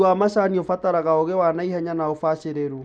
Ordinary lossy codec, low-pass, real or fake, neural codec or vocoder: none; none; real; none